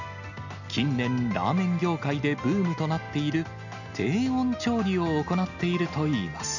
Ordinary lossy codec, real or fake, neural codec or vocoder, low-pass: none; real; none; 7.2 kHz